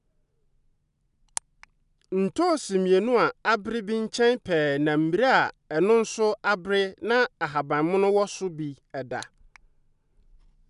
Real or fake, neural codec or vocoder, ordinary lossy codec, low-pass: real; none; none; 10.8 kHz